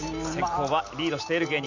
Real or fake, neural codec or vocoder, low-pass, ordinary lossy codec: real; none; 7.2 kHz; none